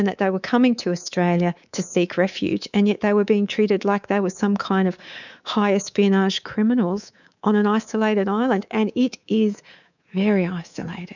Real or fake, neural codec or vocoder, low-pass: fake; codec, 24 kHz, 3.1 kbps, DualCodec; 7.2 kHz